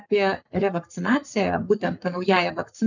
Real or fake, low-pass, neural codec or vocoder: fake; 7.2 kHz; codec, 44.1 kHz, 7.8 kbps, Pupu-Codec